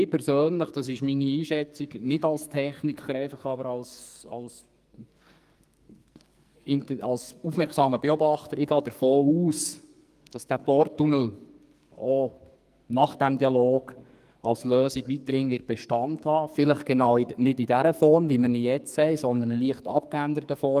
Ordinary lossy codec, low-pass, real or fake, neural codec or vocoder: Opus, 32 kbps; 14.4 kHz; fake; codec, 44.1 kHz, 2.6 kbps, SNAC